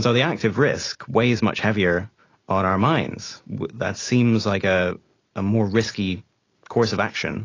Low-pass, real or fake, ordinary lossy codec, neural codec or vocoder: 7.2 kHz; real; AAC, 32 kbps; none